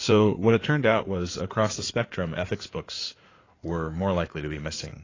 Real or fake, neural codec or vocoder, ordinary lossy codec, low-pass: fake; codec, 16 kHz in and 24 kHz out, 2.2 kbps, FireRedTTS-2 codec; AAC, 32 kbps; 7.2 kHz